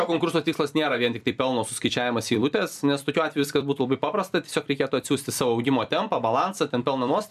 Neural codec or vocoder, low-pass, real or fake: vocoder, 44.1 kHz, 128 mel bands every 256 samples, BigVGAN v2; 14.4 kHz; fake